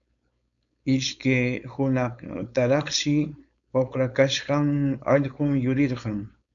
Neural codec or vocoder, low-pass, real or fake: codec, 16 kHz, 4.8 kbps, FACodec; 7.2 kHz; fake